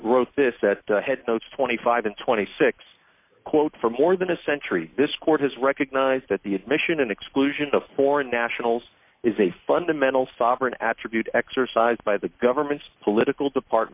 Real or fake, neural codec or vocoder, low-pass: real; none; 3.6 kHz